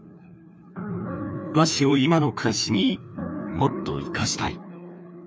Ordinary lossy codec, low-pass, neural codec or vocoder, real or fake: none; none; codec, 16 kHz, 2 kbps, FreqCodec, larger model; fake